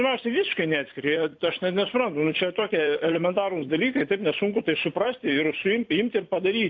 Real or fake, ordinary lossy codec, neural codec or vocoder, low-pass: fake; AAC, 48 kbps; vocoder, 44.1 kHz, 128 mel bands every 256 samples, BigVGAN v2; 7.2 kHz